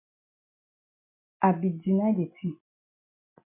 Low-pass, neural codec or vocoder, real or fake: 3.6 kHz; none; real